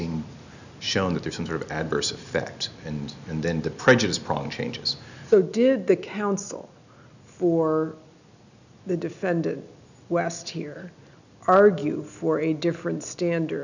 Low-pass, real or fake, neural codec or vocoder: 7.2 kHz; real; none